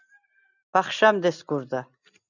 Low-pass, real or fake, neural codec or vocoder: 7.2 kHz; real; none